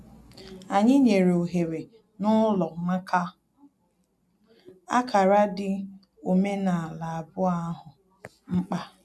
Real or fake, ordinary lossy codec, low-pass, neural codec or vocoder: real; none; none; none